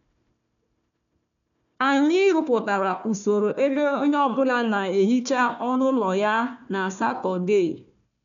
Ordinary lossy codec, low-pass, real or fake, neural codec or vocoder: none; 7.2 kHz; fake; codec, 16 kHz, 1 kbps, FunCodec, trained on Chinese and English, 50 frames a second